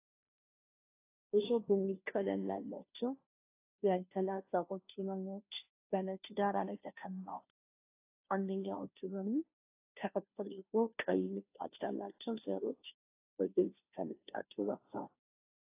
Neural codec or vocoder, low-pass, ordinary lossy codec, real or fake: codec, 16 kHz, 0.5 kbps, FunCodec, trained on Chinese and English, 25 frames a second; 3.6 kHz; AAC, 24 kbps; fake